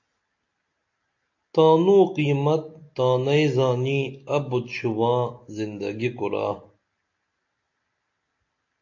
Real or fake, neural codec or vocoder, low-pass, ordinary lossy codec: real; none; 7.2 kHz; AAC, 48 kbps